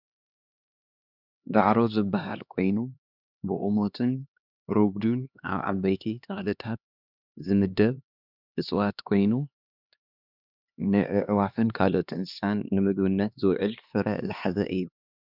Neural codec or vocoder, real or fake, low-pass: codec, 16 kHz, 2 kbps, X-Codec, HuBERT features, trained on LibriSpeech; fake; 5.4 kHz